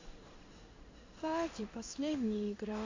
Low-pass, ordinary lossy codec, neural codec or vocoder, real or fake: 7.2 kHz; MP3, 64 kbps; codec, 16 kHz in and 24 kHz out, 1 kbps, XY-Tokenizer; fake